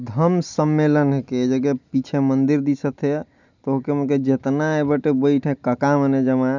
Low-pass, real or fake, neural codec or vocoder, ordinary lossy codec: 7.2 kHz; real; none; none